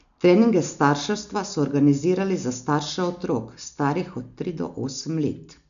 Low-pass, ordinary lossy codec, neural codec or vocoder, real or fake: 7.2 kHz; none; none; real